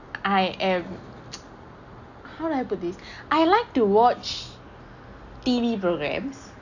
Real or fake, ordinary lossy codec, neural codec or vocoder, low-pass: real; none; none; 7.2 kHz